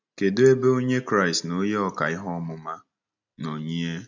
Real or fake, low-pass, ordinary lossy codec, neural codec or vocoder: real; 7.2 kHz; none; none